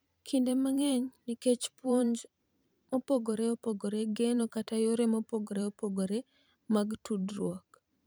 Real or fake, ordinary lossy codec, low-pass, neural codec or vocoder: fake; none; none; vocoder, 44.1 kHz, 128 mel bands every 512 samples, BigVGAN v2